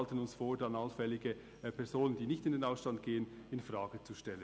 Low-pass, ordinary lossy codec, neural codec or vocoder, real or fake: none; none; none; real